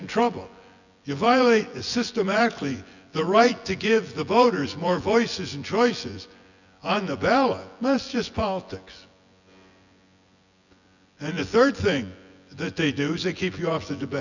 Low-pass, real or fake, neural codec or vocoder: 7.2 kHz; fake; vocoder, 24 kHz, 100 mel bands, Vocos